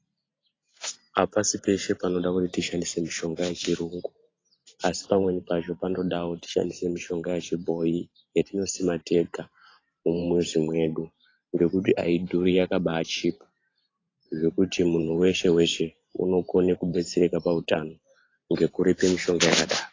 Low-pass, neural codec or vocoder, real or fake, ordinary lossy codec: 7.2 kHz; vocoder, 44.1 kHz, 128 mel bands every 512 samples, BigVGAN v2; fake; AAC, 32 kbps